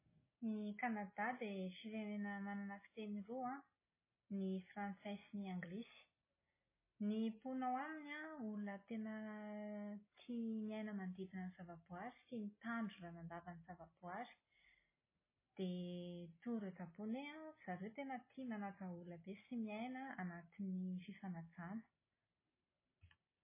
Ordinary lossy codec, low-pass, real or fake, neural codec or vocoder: MP3, 16 kbps; 3.6 kHz; real; none